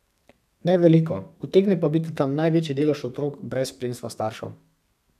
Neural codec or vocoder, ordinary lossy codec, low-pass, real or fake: codec, 32 kHz, 1.9 kbps, SNAC; none; 14.4 kHz; fake